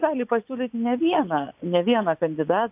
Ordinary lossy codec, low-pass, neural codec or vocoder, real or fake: AAC, 32 kbps; 3.6 kHz; vocoder, 44.1 kHz, 80 mel bands, Vocos; fake